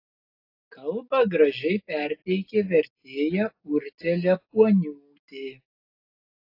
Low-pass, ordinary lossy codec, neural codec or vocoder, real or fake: 5.4 kHz; AAC, 32 kbps; none; real